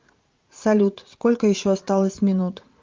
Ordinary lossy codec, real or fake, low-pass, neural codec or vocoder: Opus, 32 kbps; fake; 7.2 kHz; vocoder, 44.1 kHz, 80 mel bands, Vocos